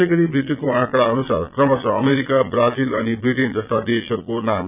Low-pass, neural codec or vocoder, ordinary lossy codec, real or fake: 3.6 kHz; vocoder, 22.05 kHz, 80 mel bands, Vocos; none; fake